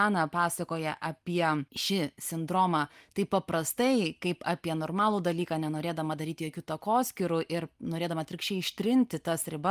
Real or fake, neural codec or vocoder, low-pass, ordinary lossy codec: real; none; 14.4 kHz; Opus, 32 kbps